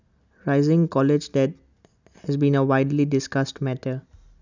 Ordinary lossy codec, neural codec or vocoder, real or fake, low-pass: none; none; real; 7.2 kHz